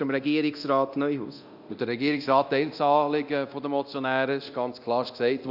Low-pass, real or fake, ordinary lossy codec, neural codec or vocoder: 5.4 kHz; fake; Opus, 64 kbps; codec, 24 kHz, 0.9 kbps, DualCodec